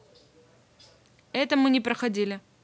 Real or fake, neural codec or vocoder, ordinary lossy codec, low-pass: real; none; none; none